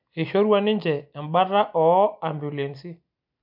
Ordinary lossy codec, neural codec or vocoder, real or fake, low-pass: MP3, 48 kbps; none; real; 5.4 kHz